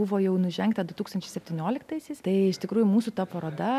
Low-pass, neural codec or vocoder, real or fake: 14.4 kHz; none; real